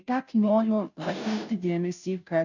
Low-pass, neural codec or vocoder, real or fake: 7.2 kHz; codec, 16 kHz, 0.5 kbps, FunCodec, trained on Chinese and English, 25 frames a second; fake